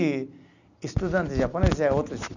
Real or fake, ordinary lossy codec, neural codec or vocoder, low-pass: real; none; none; 7.2 kHz